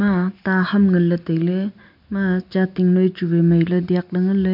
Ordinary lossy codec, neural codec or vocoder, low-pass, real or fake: MP3, 48 kbps; none; 5.4 kHz; real